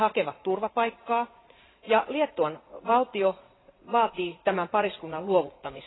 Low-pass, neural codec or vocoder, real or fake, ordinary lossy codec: 7.2 kHz; vocoder, 44.1 kHz, 80 mel bands, Vocos; fake; AAC, 16 kbps